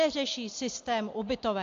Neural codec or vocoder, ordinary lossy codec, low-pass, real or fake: none; AAC, 48 kbps; 7.2 kHz; real